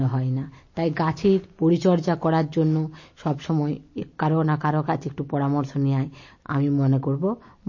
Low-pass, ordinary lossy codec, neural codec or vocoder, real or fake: 7.2 kHz; MP3, 32 kbps; none; real